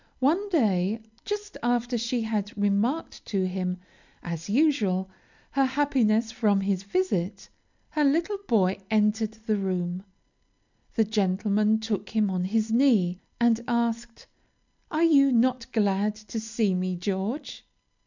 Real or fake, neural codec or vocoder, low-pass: real; none; 7.2 kHz